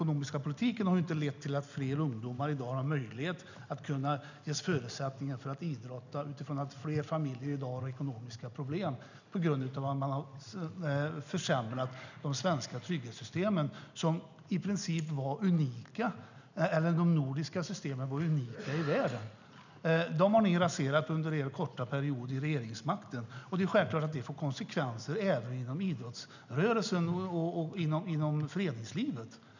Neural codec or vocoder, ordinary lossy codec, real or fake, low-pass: none; none; real; 7.2 kHz